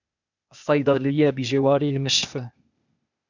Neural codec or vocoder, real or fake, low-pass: codec, 16 kHz, 0.8 kbps, ZipCodec; fake; 7.2 kHz